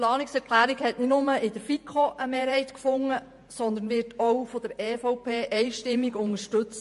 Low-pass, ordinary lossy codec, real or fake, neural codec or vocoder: 14.4 kHz; MP3, 48 kbps; fake; vocoder, 48 kHz, 128 mel bands, Vocos